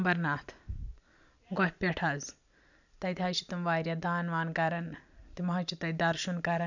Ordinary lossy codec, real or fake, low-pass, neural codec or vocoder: none; real; 7.2 kHz; none